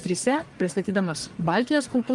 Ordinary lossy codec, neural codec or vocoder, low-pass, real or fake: Opus, 32 kbps; codec, 44.1 kHz, 1.7 kbps, Pupu-Codec; 10.8 kHz; fake